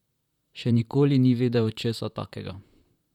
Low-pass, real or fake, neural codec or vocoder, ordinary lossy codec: 19.8 kHz; fake; vocoder, 44.1 kHz, 128 mel bands, Pupu-Vocoder; none